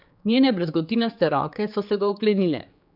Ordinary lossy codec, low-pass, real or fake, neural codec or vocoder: none; 5.4 kHz; fake; codec, 16 kHz, 4 kbps, X-Codec, HuBERT features, trained on general audio